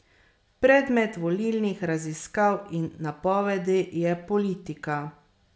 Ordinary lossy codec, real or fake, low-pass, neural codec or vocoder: none; real; none; none